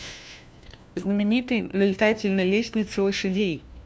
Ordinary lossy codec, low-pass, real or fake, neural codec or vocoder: none; none; fake; codec, 16 kHz, 1 kbps, FunCodec, trained on LibriTTS, 50 frames a second